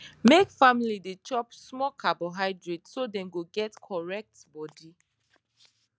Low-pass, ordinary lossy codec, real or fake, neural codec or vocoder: none; none; real; none